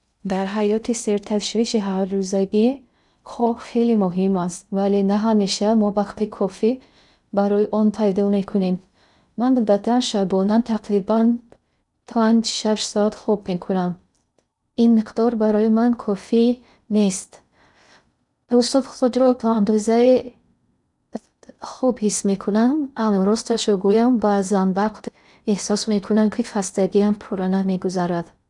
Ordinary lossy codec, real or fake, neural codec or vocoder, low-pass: none; fake; codec, 16 kHz in and 24 kHz out, 0.6 kbps, FocalCodec, streaming, 2048 codes; 10.8 kHz